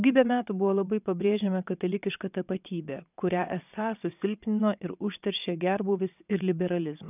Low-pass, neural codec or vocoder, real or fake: 3.6 kHz; vocoder, 22.05 kHz, 80 mel bands, WaveNeXt; fake